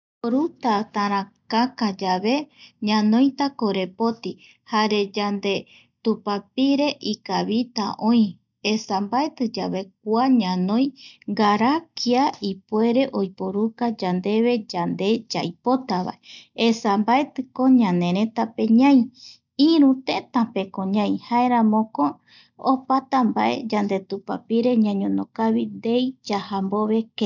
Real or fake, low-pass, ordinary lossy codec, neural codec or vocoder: real; 7.2 kHz; none; none